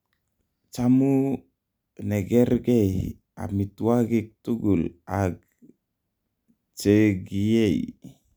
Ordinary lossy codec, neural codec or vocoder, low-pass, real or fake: none; none; none; real